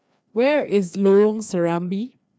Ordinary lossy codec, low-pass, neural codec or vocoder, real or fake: none; none; codec, 16 kHz, 2 kbps, FreqCodec, larger model; fake